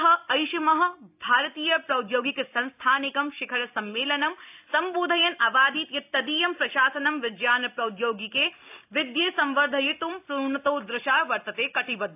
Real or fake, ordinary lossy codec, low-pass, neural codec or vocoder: real; AAC, 32 kbps; 3.6 kHz; none